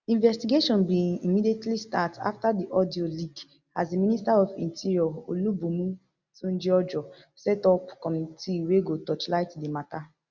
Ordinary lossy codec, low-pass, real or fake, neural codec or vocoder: Opus, 64 kbps; 7.2 kHz; real; none